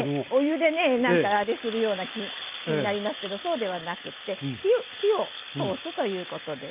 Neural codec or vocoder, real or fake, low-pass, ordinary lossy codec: none; real; 3.6 kHz; Opus, 24 kbps